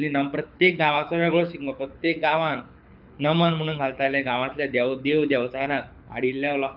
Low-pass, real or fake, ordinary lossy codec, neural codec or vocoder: 5.4 kHz; fake; none; codec, 24 kHz, 6 kbps, HILCodec